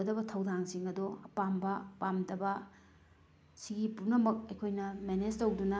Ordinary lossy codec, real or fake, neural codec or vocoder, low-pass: none; real; none; none